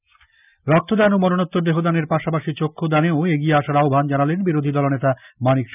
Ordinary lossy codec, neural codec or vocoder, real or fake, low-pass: none; none; real; 3.6 kHz